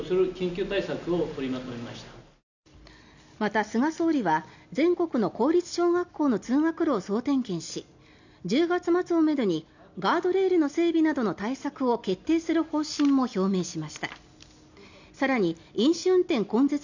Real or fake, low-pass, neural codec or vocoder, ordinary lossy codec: real; 7.2 kHz; none; none